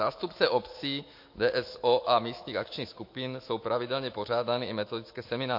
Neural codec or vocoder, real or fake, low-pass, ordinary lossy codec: autoencoder, 48 kHz, 128 numbers a frame, DAC-VAE, trained on Japanese speech; fake; 5.4 kHz; MP3, 32 kbps